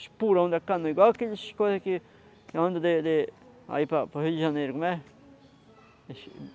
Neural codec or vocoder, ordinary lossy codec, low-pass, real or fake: none; none; none; real